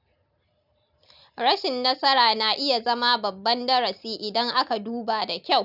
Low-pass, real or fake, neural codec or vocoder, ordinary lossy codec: 5.4 kHz; real; none; none